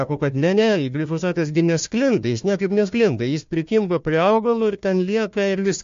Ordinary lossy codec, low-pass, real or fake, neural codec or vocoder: MP3, 48 kbps; 7.2 kHz; fake; codec, 16 kHz, 1 kbps, FunCodec, trained on Chinese and English, 50 frames a second